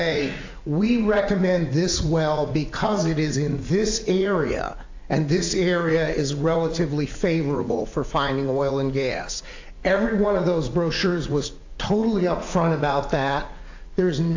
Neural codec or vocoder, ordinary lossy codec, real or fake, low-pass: vocoder, 44.1 kHz, 80 mel bands, Vocos; AAC, 48 kbps; fake; 7.2 kHz